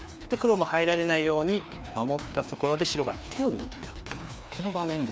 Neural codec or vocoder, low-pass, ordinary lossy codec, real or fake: codec, 16 kHz, 2 kbps, FreqCodec, larger model; none; none; fake